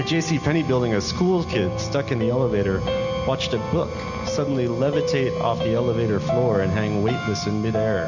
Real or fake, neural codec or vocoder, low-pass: real; none; 7.2 kHz